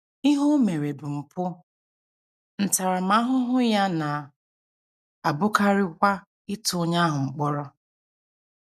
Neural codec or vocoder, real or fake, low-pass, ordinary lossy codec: none; real; 14.4 kHz; none